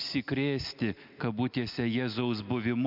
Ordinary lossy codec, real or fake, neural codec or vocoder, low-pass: MP3, 48 kbps; real; none; 5.4 kHz